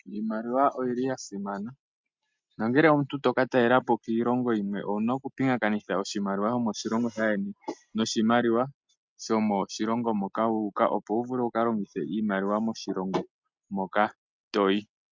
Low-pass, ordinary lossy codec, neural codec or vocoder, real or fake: 7.2 kHz; MP3, 64 kbps; none; real